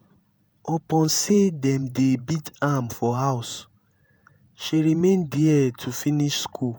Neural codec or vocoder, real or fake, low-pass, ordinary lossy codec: vocoder, 48 kHz, 128 mel bands, Vocos; fake; none; none